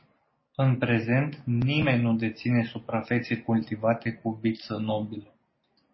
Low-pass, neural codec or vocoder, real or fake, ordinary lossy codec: 7.2 kHz; none; real; MP3, 24 kbps